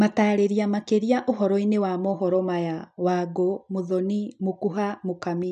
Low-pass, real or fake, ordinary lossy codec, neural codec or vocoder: 9.9 kHz; real; none; none